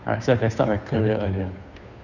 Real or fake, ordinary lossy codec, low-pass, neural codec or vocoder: fake; none; 7.2 kHz; codec, 16 kHz, 2 kbps, FunCodec, trained on Chinese and English, 25 frames a second